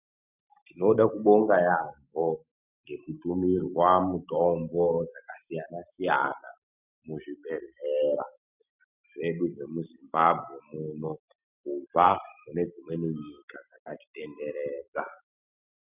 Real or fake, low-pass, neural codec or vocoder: real; 3.6 kHz; none